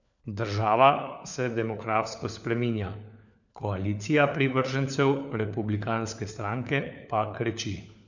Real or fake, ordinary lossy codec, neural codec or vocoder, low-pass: fake; none; codec, 16 kHz, 4 kbps, FunCodec, trained on LibriTTS, 50 frames a second; 7.2 kHz